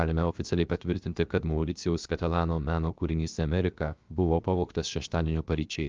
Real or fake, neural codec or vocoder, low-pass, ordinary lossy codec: fake; codec, 16 kHz, 0.7 kbps, FocalCodec; 7.2 kHz; Opus, 24 kbps